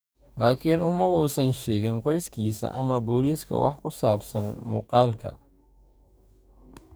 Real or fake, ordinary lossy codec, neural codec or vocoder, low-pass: fake; none; codec, 44.1 kHz, 2.6 kbps, DAC; none